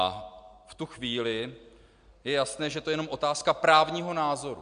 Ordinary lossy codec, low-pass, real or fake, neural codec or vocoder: MP3, 64 kbps; 9.9 kHz; real; none